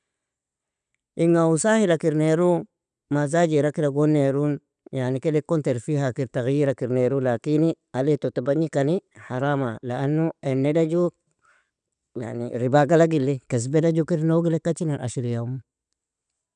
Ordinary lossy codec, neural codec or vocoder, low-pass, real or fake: none; none; 9.9 kHz; real